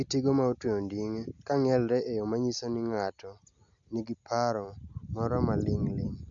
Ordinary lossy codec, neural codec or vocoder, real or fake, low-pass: none; none; real; 7.2 kHz